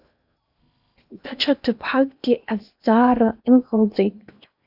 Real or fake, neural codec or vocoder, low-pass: fake; codec, 16 kHz in and 24 kHz out, 0.8 kbps, FocalCodec, streaming, 65536 codes; 5.4 kHz